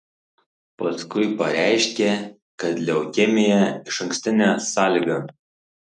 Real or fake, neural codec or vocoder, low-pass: real; none; 10.8 kHz